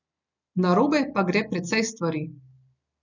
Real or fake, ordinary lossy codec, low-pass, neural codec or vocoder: real; none; 7.2 kHz; none